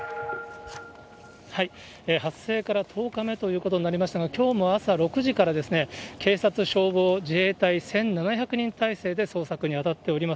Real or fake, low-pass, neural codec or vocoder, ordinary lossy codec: real; none; none; none